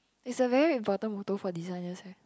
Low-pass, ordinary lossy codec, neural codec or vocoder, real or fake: none; none; none; real